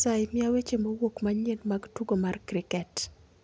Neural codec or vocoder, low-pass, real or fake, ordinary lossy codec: none; none; real; none